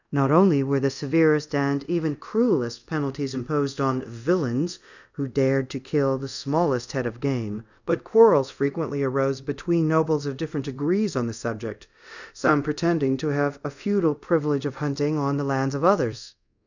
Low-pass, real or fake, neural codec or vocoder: 7.2 kHz; fake; codec, 24 kHz, 0.5 kbps, DualCodec